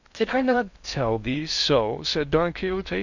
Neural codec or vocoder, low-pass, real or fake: codec, 16 kHz in and 24 kHz out, 0.6 kbps, FocalCodec, streaming, 2048 codes; 7.2 kHz; fake